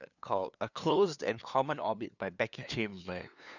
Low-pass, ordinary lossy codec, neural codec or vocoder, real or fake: 7.2 kHz; none; codec, 16 kHz, 2 kbps, FunCodec, trained on LibriTTS, 25 frames a second; fake